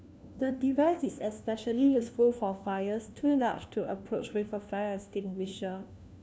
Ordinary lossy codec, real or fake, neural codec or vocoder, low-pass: none; fake; codec, 16 kHz, 1 kbps, FunCodec, trained on LibriTTS, 50 frames a second; none